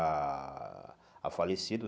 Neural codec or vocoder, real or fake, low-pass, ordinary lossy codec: none; real; none; none